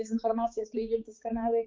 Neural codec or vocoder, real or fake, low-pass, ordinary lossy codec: codec, 16 kHz, 4 kbps, X-Codec, HuBERT features, trained on balanced general audio; fake; 7.2 kHz; Opus, 32 kbps